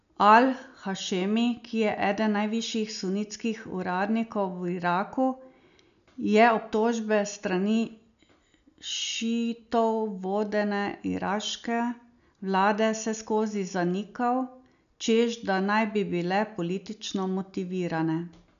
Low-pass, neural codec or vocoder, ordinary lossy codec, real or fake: 7.2 kHz; none; none; real